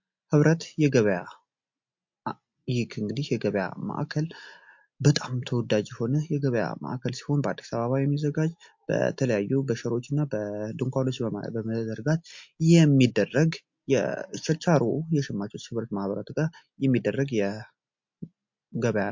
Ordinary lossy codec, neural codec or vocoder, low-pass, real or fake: MP3, 48 kbps; none; 7.2 kHz; real